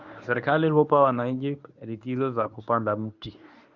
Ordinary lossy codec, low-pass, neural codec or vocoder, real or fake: none; 7.2 kHz; codec, 24 kHz, 0.9 kbps, WavTokenizer, medium speech release version 1; fake